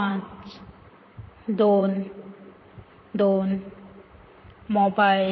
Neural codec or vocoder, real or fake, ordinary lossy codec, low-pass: vocoder, 44.1 kHz, 128 mel bands, Pupu-Vocoder; fake; MP3, 24 kbps; 7.2 kHz